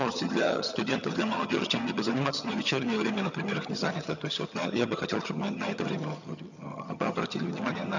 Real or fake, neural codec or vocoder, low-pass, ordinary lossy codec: fake; vocoder, 22.05 kHz, 80 mel bands, HiFi-GAN; 7.2 kHz; none